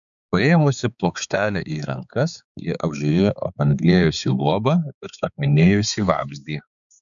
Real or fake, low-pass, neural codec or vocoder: fake; 7.2 kHz; codec, 16 kHz, 4 kbps, X-Codec, HuBERT features, trained on balanced general audio